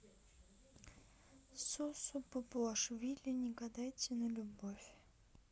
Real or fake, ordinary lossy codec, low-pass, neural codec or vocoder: real; none; none; none